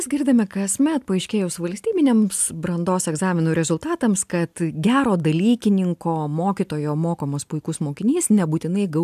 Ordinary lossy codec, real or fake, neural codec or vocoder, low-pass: AAC, 96 kbps; real; none; 14.4 kHz